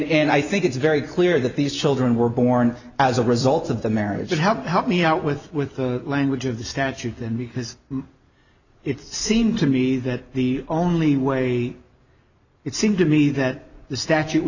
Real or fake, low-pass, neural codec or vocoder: real; 7.2 kHz; none